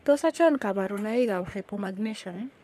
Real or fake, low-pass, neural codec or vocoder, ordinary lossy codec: fake; 14.4 kHz; codec, 44.1 kHz, 3.4 kbps, Pupu-Codec; none